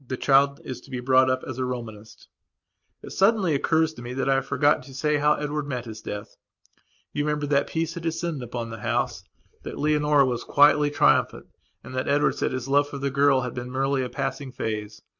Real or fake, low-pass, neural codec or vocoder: real; 7.2 kHz; none